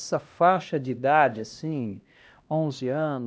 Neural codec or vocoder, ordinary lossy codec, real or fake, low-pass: codec, 16 kHz, 1 kbps, X-Codec, HuBERT features, trained on LibriSpeech; none; fake; none